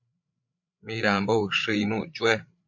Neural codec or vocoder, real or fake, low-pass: codec, 16 kHz, 8 kbps, FreqCodec, larger model; fake; 7.2 kHz